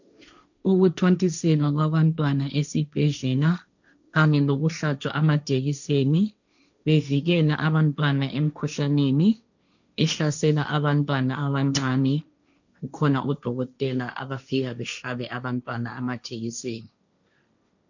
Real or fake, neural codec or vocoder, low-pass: fake; codec, 16 kHz, 1.1 kbps, Voila-Tokenizer; 7.2 kHz